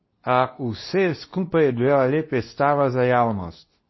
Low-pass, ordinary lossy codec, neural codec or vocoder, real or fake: 7.2 kHz; MP3, 24 kbps; codec, 24 kHz, 0.9 kbps, WavTokenizer, medium speech release version 2; fake